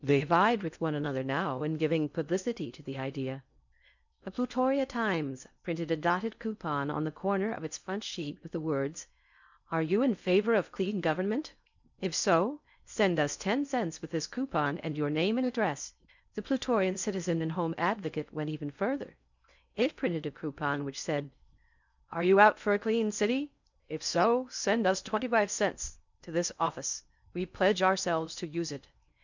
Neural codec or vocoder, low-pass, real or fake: codec, 16 kHz in and 24 kHz out, 0.6 kbps, FocalCodec, streaming, 2048 codes; 7.2 kHz; fake